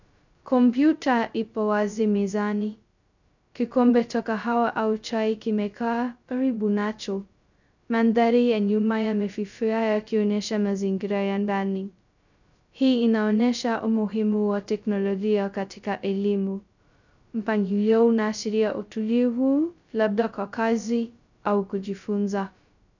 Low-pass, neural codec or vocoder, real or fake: 7.2 kHz; codec, 16 kHz, 0.2 kbps, FocalCodec; fake